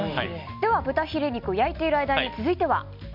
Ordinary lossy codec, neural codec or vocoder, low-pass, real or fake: none; none; 5.4 kHz; real